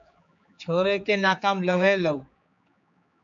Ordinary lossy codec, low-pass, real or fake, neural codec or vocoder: MP3, 64 kbps; 7.2 kHz; fake; codec, 16 kHz, 4 kbps, X-Codec, HuBERT features, trained on general audio